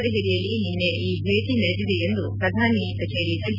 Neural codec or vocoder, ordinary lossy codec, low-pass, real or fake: none; none; 5.4 kHz; real